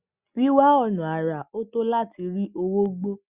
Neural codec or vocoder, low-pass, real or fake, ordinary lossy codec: none; 3.6 kHz; real; Opus, 64 kbps